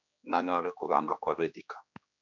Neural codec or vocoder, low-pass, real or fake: codec, 16 kHz, 2 kbps, X-Codec, HuBERT features, trained on general audio; 7.2 kHz; fake